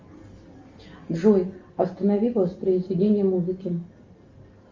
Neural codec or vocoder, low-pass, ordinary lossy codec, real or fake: none; 7.2 kHz; Opus, 32 kbps; real